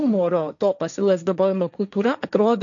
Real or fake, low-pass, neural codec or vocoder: fake; 7.2 kHz; codec, 16 kHz, 1.1 kbps, Voila-Tokenizer